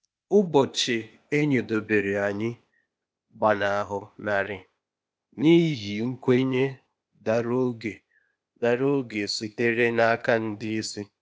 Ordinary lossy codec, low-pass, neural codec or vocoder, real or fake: none; none; codec, 16 kHz, 0.8 kbps, ZipCodec; fake